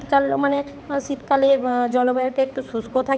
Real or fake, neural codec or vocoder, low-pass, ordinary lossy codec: fake; codec, 16 kHz, 4 kbps, X-Codec, HuBERT features, trained on balanced general audio; none; none